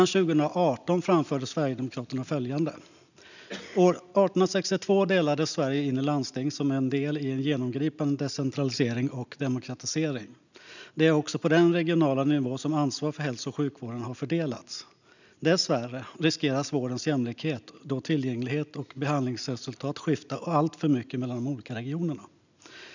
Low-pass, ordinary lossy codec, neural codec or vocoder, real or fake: 7.2 kHz; none; none; real